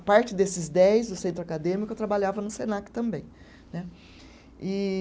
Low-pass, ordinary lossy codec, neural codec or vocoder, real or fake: none; none; none; real